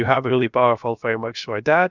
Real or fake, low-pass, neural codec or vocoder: fake; 7.2 kHz; codec, 16 kHz, about 1 kbps, DyCAST, with the encoder's durations